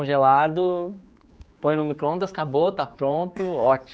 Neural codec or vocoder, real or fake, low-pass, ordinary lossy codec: codec, 16 kHz, 4 kbps, X-Codec, HuBERT features, trained on general audio; fake; none; none